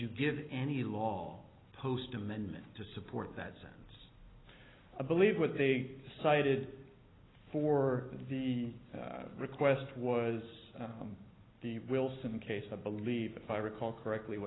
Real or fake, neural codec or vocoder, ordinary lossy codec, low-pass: real; none; AAC, 16 kbps; 7.2 kHz